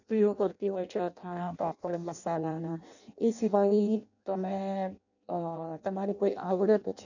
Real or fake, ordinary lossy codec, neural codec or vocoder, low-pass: fake; none; codec, 16 kHz in and 24 kHz out, 0.6 kbps, FireRedTTS-2 codec; 7.2 kHz